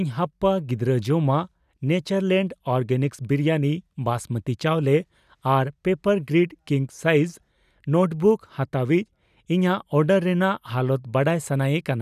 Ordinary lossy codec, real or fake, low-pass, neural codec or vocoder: none; real; 14.4 kHz; none